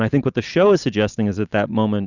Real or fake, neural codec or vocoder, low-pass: real; none; 7.2 kHz